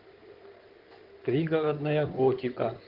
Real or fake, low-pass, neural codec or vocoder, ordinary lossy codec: fake; 5.4 kHz; codec, 16 kHz in and 24 kHz out, 2.2 kbps, FireRedTTS-2 codec; Opus, 16 kbps